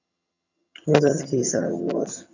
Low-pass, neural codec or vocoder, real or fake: 7.2 kHz; vocoder, 22.05 kHz, 80 mel bands, HiFi-GAN; fake